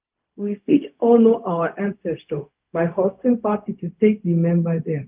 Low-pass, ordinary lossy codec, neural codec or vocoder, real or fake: 3.6 kHz; Opus, 24 kbps; codec, 16 kHz, 0.4 kbps, LongCat-Audio-Codec; fake